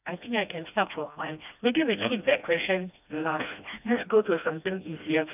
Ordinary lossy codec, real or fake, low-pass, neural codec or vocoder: none; fake; 3.6 kHz; codec, 16 kHz, 1 kbps, FreqCodec, smaller model